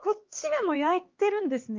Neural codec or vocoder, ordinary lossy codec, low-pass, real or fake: codec, 16 kHz, 2 kbps, X-Codec, HuBERT features, trained on LibriSpeech; Opus, 32 kbps; 7.2 kHz; fake